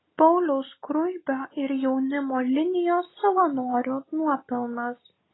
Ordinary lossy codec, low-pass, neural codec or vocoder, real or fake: AAC, 16 kbps; 7.2 kHz; none; real